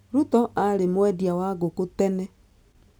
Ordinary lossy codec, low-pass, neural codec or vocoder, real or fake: none; none; none; real